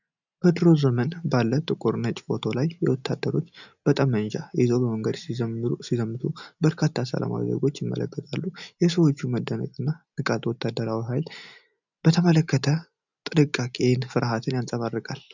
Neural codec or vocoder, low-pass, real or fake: none; 7.2 kHz; real